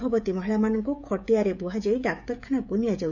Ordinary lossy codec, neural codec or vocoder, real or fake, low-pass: none; codec, 16 kHz, 16 kbps, FreqCodec, smaller model; fake; 7.2 kHz